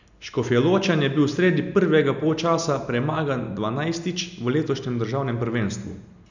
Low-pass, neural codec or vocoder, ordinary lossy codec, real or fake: 7.2 kHz; none; none; real